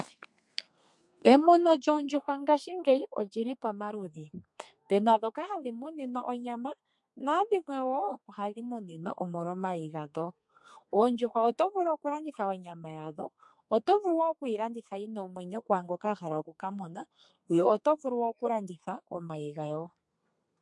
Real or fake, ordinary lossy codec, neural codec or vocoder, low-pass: fake; MP3, 64 kbps; codec, 32 kHz, 1.9 kbps, SNAC; 10.8 kHz